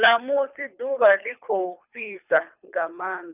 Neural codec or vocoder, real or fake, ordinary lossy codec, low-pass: codec, 24 kHz, 3 kbps, HILCodec; fake; none; 3.6 kHz